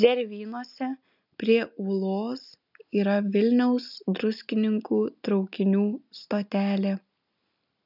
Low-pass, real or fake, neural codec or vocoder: 5.4 kHz; real; none